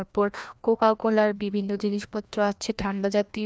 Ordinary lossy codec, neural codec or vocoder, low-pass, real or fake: none; codec, 16 kHz, 1 kbps, FreqCodec, larger model; none; fake